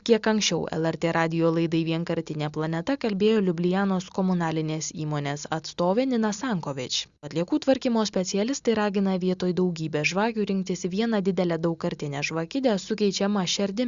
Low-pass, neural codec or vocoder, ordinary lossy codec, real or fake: 7.2 kHz; none; Opus, 64 kbps; real